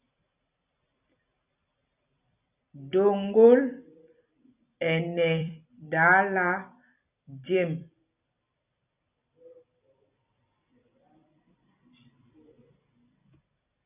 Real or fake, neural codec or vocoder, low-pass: fake; vocoder, 44.1 kHz, 128 mel bands every 256 samples, BigVGAN v2; 3.6 kHz